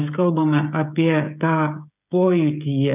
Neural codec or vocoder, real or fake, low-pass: codec, 16 kHz, 16 kbps, FreqCodec, smaller model; fake; 3.6 kHz